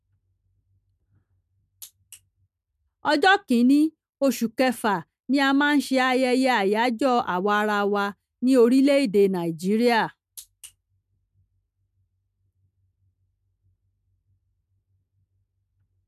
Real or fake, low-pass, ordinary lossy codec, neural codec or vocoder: real; 14.4 kHz; MP3, 96 kbps; none